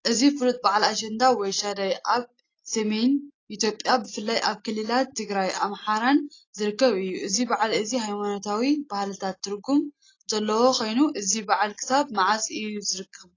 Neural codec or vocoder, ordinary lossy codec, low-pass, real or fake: none; AAC, 32 kbps; 7.2 kHz; real